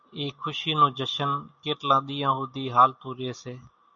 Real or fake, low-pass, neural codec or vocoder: real; 7.2 kHz; none